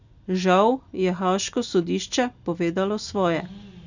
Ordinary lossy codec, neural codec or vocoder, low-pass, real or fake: none; none; 7.2 kHz; real